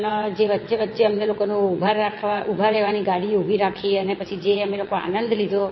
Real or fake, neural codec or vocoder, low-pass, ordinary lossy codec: fake; vocoder, 22.05 kHz, 80 mel bands, WaveNeXt; 7.2 kHz; MP3, 24 kbps